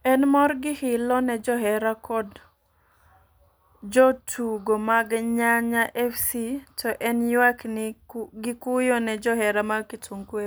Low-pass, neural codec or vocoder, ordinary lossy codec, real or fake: none; none; none; real